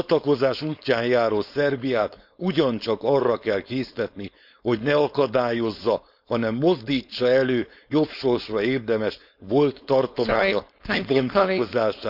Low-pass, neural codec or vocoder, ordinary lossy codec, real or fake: 5.4 kHz; codec, 16 kHz, 4.8 kbps, FACodec; none; fake